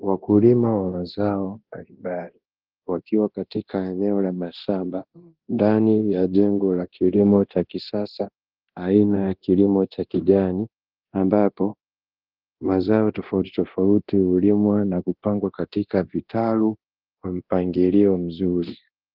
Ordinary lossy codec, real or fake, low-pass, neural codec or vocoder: Opus, 16 kbps; fake; 5.4 kHz; codec, 24 kHz, 0.9 kbps, DualCodec